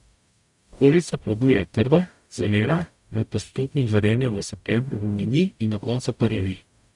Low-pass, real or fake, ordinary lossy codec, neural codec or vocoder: 10.8 kHz; fake; none; codec, 44.1 kHz, 0.9 kbps, DAC